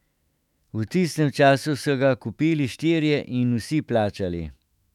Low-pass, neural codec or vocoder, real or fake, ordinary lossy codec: 19.8 kHz; autoencoder, 48 kHz, 128 numbers a frame, DAC-VAE, trained on Japanese speech; fake; none